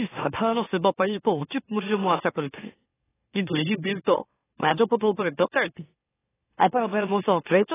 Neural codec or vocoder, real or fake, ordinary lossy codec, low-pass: autoencoder, 44.1 kHz, a latent of 192 numbers a frame, MeloTTS; fake; AAC, 16 kbps; 3.6 kHz